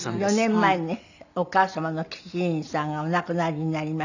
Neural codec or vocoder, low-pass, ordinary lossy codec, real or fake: none; 7.2 kHz; none; real